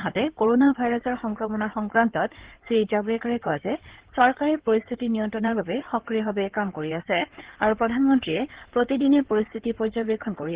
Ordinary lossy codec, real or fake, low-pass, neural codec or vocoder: Opus, 16 kbps; fake; 3.6 kHz; codec, 16 kHz in and 24 kHz out, 2.2 kbps, FireRedTTS-2 codec